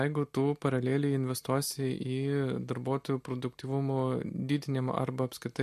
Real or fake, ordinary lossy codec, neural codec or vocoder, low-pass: real; MP3, 64 kbps; none; 14.4 kHz